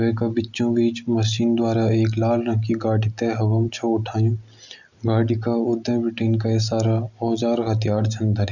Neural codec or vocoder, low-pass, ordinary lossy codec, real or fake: none; 7.2 kHz; none; real